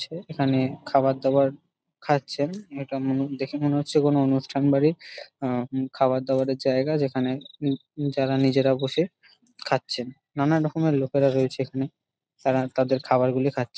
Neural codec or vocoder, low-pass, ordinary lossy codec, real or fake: none; none; none; real